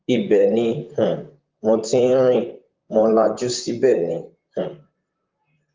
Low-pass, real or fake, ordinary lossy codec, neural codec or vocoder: 7.2 kHz; fake; Opus, 32 kbps; vocoder, 44.1 kHz, 128 mel bands, Pupu-Vocoder